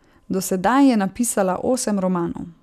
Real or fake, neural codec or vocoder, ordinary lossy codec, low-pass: real; none; none; 14.4 kHz